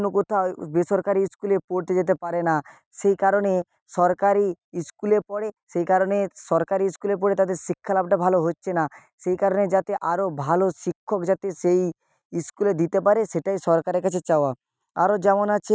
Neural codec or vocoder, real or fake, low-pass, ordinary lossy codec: none; real; none; none